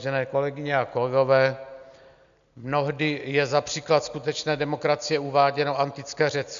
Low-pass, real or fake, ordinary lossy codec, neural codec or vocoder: 7.2 kHz; real; MP3, 64 kbps; none